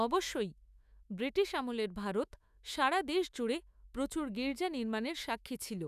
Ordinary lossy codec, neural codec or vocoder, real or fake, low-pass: none; autoencoder, 48 kHz, 128 numbers a frame, DAC-VAE, trained on Japanese speech; fake; 14.4 kHz